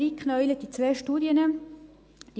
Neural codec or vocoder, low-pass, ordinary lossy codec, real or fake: none; none; none; real